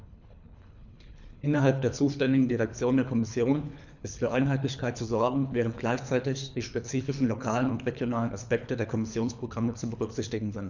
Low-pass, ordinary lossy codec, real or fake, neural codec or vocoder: 7.2 kHz; none; fake; codec, 24 kHz, 3 kbps, HILCodec